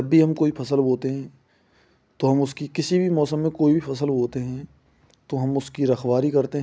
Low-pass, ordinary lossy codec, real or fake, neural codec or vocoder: none; none; real; none